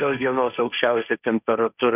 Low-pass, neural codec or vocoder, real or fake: 3.6 kHz; codec, 16 kHz, 1.1 kbps, Voila-Tokenizer; fake